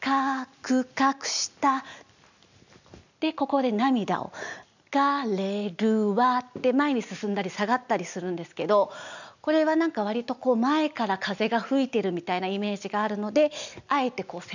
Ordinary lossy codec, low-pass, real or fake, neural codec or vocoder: none; 7.2 kHz; real; none